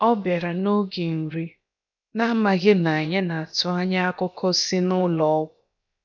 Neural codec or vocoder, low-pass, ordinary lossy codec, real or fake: codec, 16 kHz, about 1 kbps, DyCAST, with the encoder's durations; 7.2 kHz; none; fake